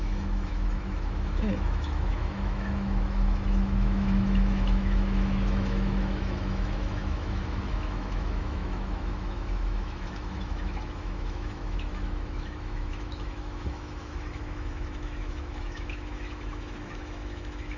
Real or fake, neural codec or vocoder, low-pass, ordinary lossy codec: fake; autoencoder, 48 kHz, 128 numbers a frame, DAC-VAE, trained on Japanese speech; 7.2 kHz; none